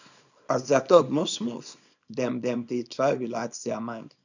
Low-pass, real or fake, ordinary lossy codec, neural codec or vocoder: 7.2 kHz; fake; none; codec, 24 kHz, 0.9 kbps, WavTokenizer, small release